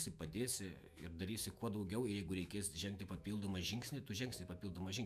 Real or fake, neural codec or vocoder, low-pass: fake; autoencoder, 48 kHz, 128 numbers a frame, DAC-VAE, trained on Japanese speech; 14.4 kHz